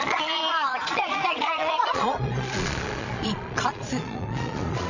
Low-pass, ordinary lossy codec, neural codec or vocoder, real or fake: 7.2 kHz; none; vocoder, 22.05 kHz, 80 mel bands, Vocos; fake